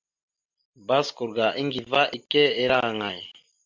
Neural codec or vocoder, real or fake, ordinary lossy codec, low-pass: none; real; MP3, 64 kbps; 7.2 kHz